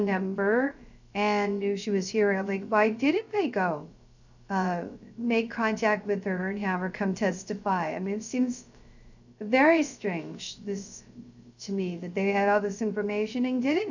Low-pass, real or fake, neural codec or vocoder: 7.2 kHz; fake; codec, 16 kHz, 0.3 kbps, FocalCodec